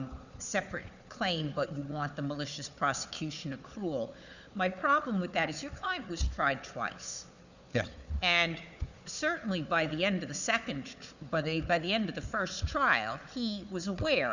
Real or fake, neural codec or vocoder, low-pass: fake; codec, 16 kHz, 4 kbps, FunCodec, trained on Chinese and English, 50 frames a second; 7.2 kHz